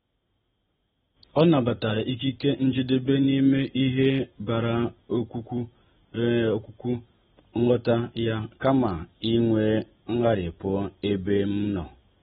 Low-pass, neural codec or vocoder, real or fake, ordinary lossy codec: 19.8 kHz; none; real; AAC, 16 kbps